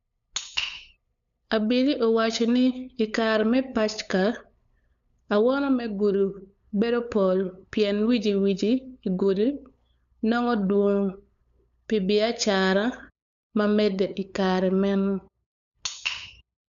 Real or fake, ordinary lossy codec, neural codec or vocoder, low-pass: fake; none; codec, 16 kHz, 8 kbps, FunCodec, trained on LibriTTS, 25 frames a second; 7.2 kHz